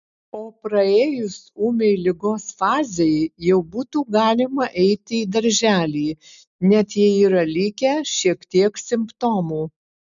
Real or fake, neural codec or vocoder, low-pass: real; none; 7.2 kHz